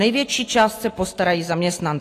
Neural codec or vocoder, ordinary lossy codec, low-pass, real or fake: none; AAC, 48 kbps; 14.4 kHz; real